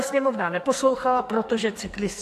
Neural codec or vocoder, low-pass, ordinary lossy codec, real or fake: codec, 44.1 kHz, 2.6 kbps, SNAC; 14.4 kHz; AAC, 64 kbps; fake